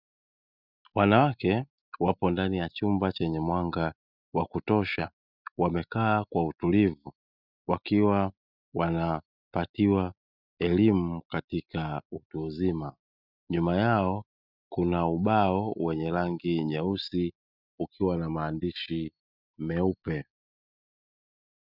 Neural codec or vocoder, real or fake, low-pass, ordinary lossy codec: none; real; 5.4 kHz; Opus, 64 kbps